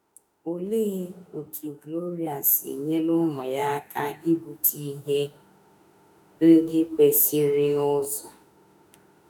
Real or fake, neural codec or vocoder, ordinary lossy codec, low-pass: fake; autoencoder, 48 kHz, 32 numbers a frame, DAC-VAE, trained on Japanese speech; none; none